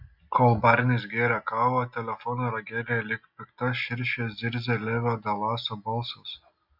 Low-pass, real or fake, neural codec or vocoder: 5.4 kHz; real; none